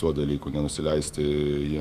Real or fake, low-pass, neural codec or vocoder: fake; 14.4 kHz; autoencoder, 48 kHz, 128 numbers a frame, DAC-VAE, trained on Japanese speech